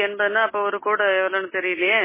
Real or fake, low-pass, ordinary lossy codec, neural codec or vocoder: real; 3.6 kHz; MP3, 16 kbps; none